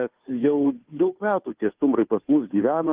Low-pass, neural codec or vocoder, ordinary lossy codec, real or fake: 3.6 kHz; codec, 16 kHz, 2 kbps, FunCodec, trained on Chinese and English, 25 frames a second; Opus, 32 kbps; fake